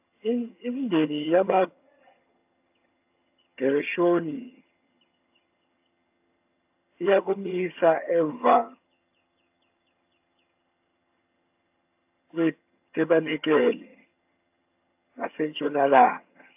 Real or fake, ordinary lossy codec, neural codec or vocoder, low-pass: fake; AAC, 32 kbps; vocoder, 22.05 kHz, 80 mel bands, HiFi-GAN; 3.6 kHz